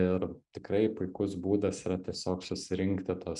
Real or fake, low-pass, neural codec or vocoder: real; 10.8 kHz; none